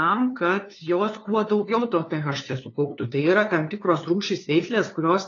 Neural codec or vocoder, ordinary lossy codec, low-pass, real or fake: codec, 16 kHz, 2 kbps, FunCodec, trained on LibriTTS, 25 frames a second; AAC, 32 kbps; 7.2 kHz; fake